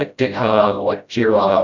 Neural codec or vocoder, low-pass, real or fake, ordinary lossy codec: codec, 16 kHz, 0.5 kbps, FreqCodec, smaller model; 7.2 kHz; fake; Opus, 64 kbps